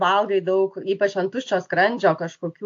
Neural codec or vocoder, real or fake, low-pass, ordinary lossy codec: none; real; 7.2 kHz; AAC, 48 kbps